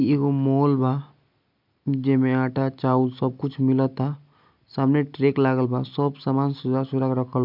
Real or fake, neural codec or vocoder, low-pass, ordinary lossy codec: real; none; 5.4 kHz; MP3, 48 kbps